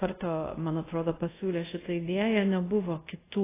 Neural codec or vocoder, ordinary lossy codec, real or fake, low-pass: codec, 24 kHz, 0.5 kbps, DualCodec; AAC, 16 kbps; fake; 3.6 kHz